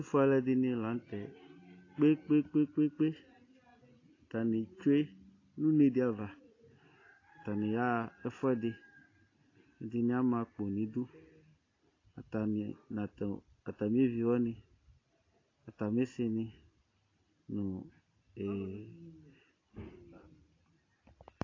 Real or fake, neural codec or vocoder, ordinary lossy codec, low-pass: real; none; AAC, 32 kbps; 7.2 kHz